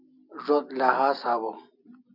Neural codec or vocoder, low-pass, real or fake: none; 5.4 kHz; real